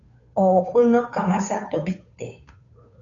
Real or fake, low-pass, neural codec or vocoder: fake; 7.2 kHz; codec, 16 kHz, 2 kbps, FunCodec, trained on Chinese and English, 25 frames a second